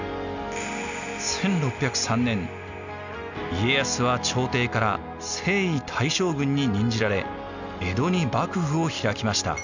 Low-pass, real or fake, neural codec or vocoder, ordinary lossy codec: 7.2 kHz; real; none; none